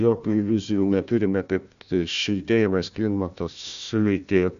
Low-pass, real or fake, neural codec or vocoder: 7.2 kHz; fake; codec, 16 kHz, 1 kbps, FunCodec, trained on Chinese and English, 50 frames a second